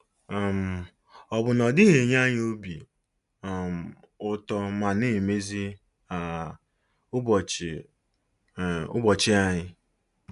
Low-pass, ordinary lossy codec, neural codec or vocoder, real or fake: 10.8 kHz; none; none; real